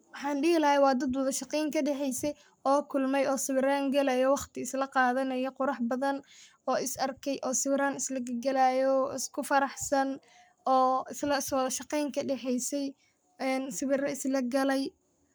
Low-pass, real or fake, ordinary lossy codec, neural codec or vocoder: none; fake; none; codec, 44.1 kHz, 7.8 kbps, Pupu-Codec